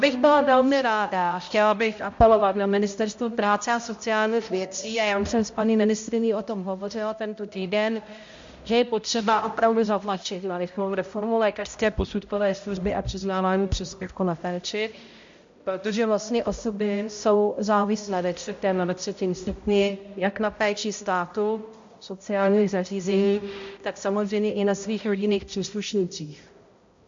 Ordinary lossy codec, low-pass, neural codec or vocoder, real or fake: MP3, 48 kbps; 7.2 kHz; codec, 16 kHz, 0.5 kbps, X-Codec, HuBERT features, trained on balanced general audio; fake